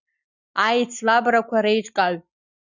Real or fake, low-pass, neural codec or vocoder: real; 7.2 kHz; none